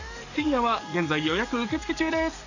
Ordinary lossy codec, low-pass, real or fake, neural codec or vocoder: none; 7.2 kHz; fake; codec, 44.1 kHz, 7.8 kbps, DAC